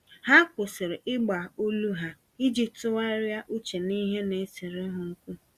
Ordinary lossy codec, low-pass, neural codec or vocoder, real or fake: Opus, 64 kbps; 14.4 kHz; none; real